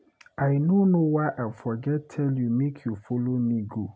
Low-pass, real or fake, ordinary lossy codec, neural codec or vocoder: none; real; none; none